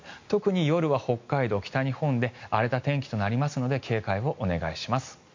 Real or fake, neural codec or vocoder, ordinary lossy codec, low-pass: real; none; MP3, 48 kbps; 7.2 kHz